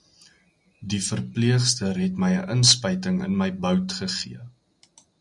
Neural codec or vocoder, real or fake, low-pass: none; real; 10.8 kHz